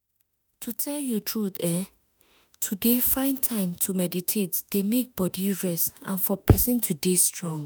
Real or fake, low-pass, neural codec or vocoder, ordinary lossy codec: fake; none; autoencoder, 48 kHz, 32 numbers a frame, DAC-VAE, trained on Japanese speech; none